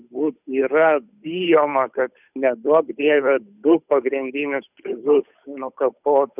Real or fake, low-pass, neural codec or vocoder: fake; 3.6 kHz; codec, 16 kHz, 8 kbps, FunCodec, trained on Chinese and English, 25 frames a second